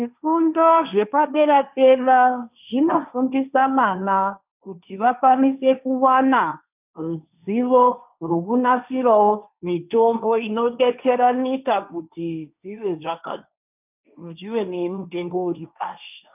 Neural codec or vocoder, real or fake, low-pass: codec, 16 kHz, 1.1 kbps, Voila-Tokenizer; fake; 3.6 kHz